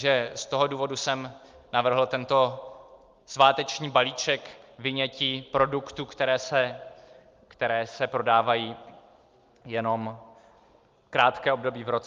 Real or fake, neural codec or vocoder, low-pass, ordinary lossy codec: real; none; 7.2 kHz; Opus, 32 kbps